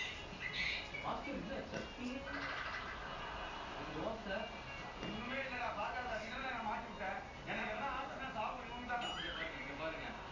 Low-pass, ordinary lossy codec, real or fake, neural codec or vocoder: 7.2 kHz; MP3, 48 kbps; real; none